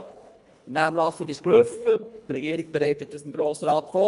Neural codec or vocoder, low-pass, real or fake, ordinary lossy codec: codec, 24 kHz, 1.5 kbps, HILCodec; 10.8 kHz; fake; AAC, 96 kbps